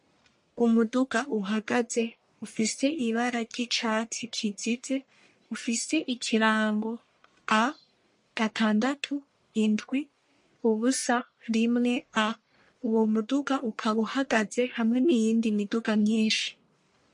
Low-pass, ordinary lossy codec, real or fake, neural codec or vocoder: 10.8 kHz; MP3, 48 kbps; fake; codec, 44.1 kHz, 1.7 kbps, Pupu-Codec